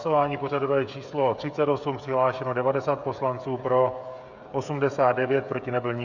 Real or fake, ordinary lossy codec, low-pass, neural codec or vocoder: fake; Opus, 64 kbps; 7.2 kHz; codec, 16 kHz, 16 kbps, FreqCodec, smaller model